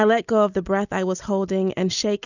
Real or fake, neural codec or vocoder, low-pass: real; none; 7.2 kHz